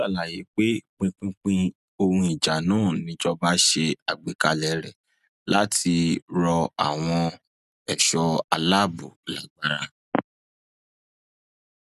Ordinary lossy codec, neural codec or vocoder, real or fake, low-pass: none; none; real; 14.4 kHz